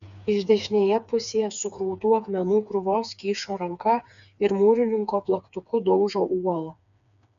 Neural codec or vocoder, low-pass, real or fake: codec, 16 kHz, 4 kbps, FreqCodec, smaller model; 7.2 kHz; fake